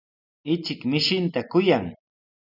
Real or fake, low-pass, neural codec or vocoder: real; 5.4 kHz; none